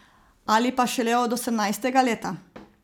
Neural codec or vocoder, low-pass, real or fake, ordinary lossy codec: none; none; real; none